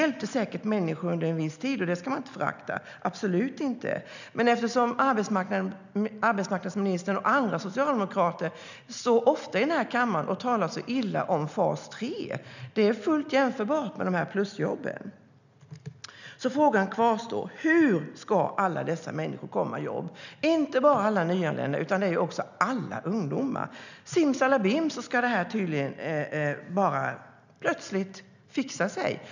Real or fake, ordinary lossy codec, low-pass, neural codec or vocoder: real; none; 7.2 kHz; none